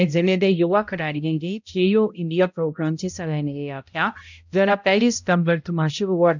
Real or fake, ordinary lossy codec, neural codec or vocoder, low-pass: fake; none; codec, 16 kHz, 0.5 kbps, X-Codec, HuBERT features, trained on balanced general audio; 7.2 kHz